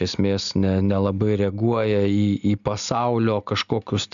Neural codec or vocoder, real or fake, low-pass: none; real; 7.2 kHz